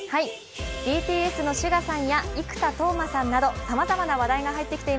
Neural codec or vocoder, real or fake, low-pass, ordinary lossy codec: none; real; none; none